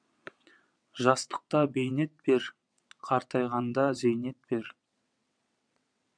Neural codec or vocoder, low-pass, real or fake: vocoder, 22.05 kHz, 80 mel bands, WaveNeXt; 9.9 kHz; fake